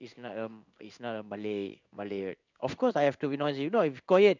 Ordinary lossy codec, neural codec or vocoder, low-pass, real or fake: none; codec, 16 kHz in and 24 kHz out, 1 kbps, XY-Tokenizer; 7.2 kHz; fake